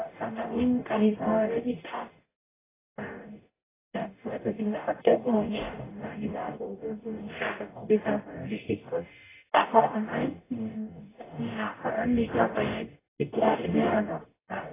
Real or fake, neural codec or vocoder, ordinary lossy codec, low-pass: fake; codec, 44.1 kHz, 0.9 kbps, DAC; AAC, 16 kbps; 3.6 kHz